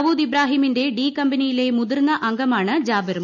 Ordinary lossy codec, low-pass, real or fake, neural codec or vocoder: none; 7.2 kHz; real; none